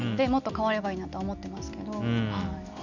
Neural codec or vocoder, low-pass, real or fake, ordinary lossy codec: none; 7.2 kHz; real; none